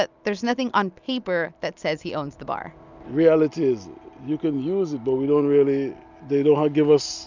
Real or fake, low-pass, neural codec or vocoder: real; 7.2 kHz; none